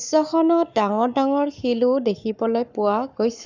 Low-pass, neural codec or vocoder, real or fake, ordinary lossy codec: 7.2 kHz; codec, 44.1 kHz, 7.8 kbps, Pupu-Codec; fake; none